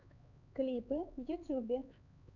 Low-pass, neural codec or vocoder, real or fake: 7.2 kHz; codec, 16 kHz, 4 kbps, X-Codec, HuBERT features, trained on LibriSpeech; fake